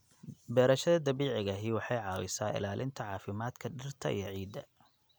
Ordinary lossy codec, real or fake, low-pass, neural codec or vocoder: none; real; none; none